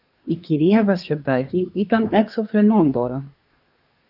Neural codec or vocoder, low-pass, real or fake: codec, 24 kHz, 1 kbps, SNAC; 5.4 kHz; fake